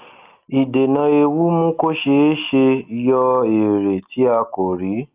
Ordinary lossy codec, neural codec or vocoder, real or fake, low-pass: Opus, 32 kbps; none; real; 3.6 kHz